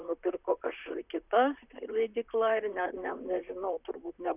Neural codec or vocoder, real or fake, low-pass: vocoder, 44.1 kHz, 128 mel bands, Pupu-Vocoder; fake; 3.6 kHz